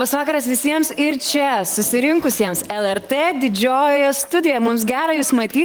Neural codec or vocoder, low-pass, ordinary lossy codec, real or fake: vocoder, 44.1 kHz, 128 mel bands, Pupu-Vocoder; 19.8 kHz; Opus, 24 kbps; fake